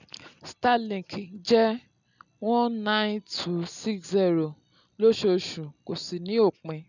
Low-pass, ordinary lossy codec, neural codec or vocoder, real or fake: 7.2 kHz; none; none; real